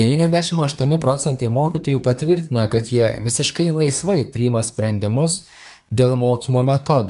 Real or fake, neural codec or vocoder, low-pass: fake; codec, 24 kHz, 1 kbps, SNAC; 10.8 kHz